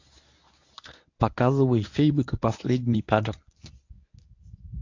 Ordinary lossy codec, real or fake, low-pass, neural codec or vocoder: AAC, 48 kbps; fake; 7.2 kHz; codec, 24 kHz, 0.9 kbps, WavTokenizer, medium speech release version 1